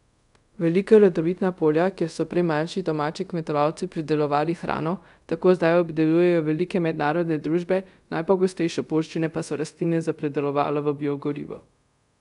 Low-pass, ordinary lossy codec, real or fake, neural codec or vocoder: 10.8 kHz; none; fake; codec, 24 kHz, 0.5 kbps, DualCodec